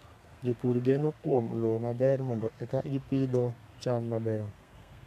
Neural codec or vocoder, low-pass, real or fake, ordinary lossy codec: codec, 32 kHz, 1.9 kbps, SNAC; 14.4 kHz; fake; MP3, 96 kbps